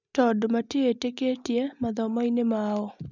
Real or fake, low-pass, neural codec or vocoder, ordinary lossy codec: real; 7.2 kHz; none; none